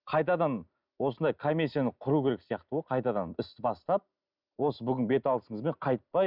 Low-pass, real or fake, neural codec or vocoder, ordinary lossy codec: 5.4 kHz; real; none; none